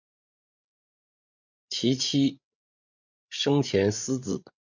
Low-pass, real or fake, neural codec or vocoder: 7.2 kHz; fake; codec, 16 kHz, 8 kbps, FreqCodec, larger model